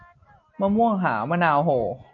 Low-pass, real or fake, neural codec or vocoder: 7.2 kHz; real; none